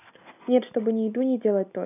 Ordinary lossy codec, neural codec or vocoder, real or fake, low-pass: none; none; real; 3.6 kHz